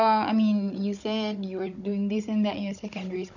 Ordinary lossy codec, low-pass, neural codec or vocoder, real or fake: none; 7.2 kHz; codec, 16 kHz, 16 kbps, FunCodec, trained on Chinese and English, 50 frames a second; fake